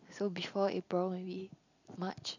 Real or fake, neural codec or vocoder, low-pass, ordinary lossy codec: real; none; 7.2 kHz; none